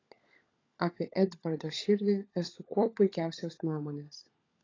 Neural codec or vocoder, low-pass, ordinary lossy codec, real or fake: codec, 16 kHz, 16 kbps, FunCodec, trained on LibriTTS, 50 frames a second; 7.2 kHz; AAC, 32 kbps; fake